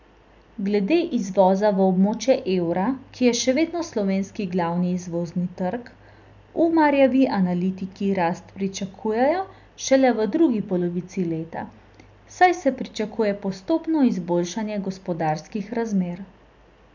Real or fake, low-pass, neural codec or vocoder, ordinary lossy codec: real; 7.2 kHz; none; none